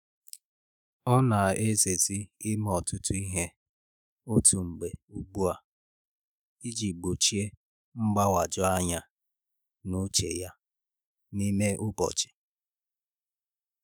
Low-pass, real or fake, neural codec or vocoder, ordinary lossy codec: none; fake; autoencoder, 48 kHz, 128 numbers a frame, DAC-VAE, trained on Japanese speech; none